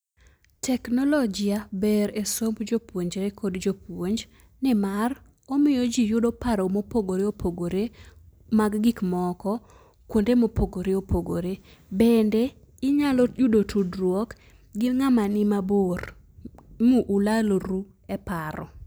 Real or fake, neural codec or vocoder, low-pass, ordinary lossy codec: real; none; none; none